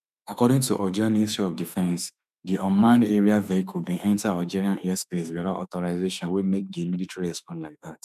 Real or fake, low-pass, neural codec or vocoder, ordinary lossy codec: fake; 14.4 kHz; autoencoder, 48 kHz, 32 numbers a frame, DAC-VAE, trained on Japanese speech; none